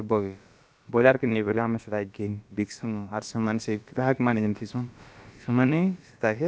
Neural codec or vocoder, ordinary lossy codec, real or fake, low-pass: codec, 16 kHz, about 1 kbps, DyCAST, with the encoder's durations; none; fake; none